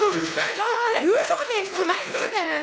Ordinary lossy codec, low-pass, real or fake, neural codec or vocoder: none; none; fake; codec, 16 kHz, 1 kbps, X-Codec, WavLM features, trained on Multilingual LibriSpeech